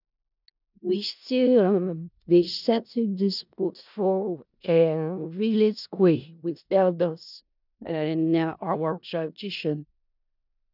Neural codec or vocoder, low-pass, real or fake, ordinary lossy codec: codec, 16 kHz in and 24 kHz out, 0.4 kbps, LongCat-Audio-Codec, four codebook decoder; 5.4 kHz; fake; none